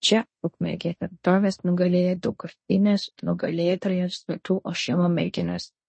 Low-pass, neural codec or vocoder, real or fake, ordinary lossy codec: 9.9 kHz; codec, 16 kHz in and 24 kHz out, 0.9 kbps, LongCat-Audio-Codec, fine tuned four codebook decoder; fake; MP3, 32 kbps